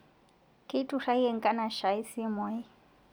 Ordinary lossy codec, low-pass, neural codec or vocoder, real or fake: none; none; none; real